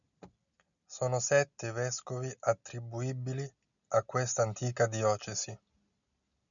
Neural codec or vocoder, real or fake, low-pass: none; real; 7.2 kHz